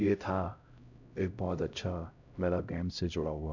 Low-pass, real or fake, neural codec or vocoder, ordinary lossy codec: 7.2 kHz; fake; codec, 16 kHz, 0.5 kbps, X-Codec, HuBERT features, trained on LibriSpeech; none